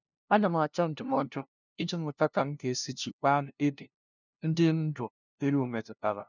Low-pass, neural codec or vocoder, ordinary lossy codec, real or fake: 7.2 kHz; codec, 16 kHz, 0.5 kbps, FunCodec, trained on LibriTTS, 25 frames a second; none; fake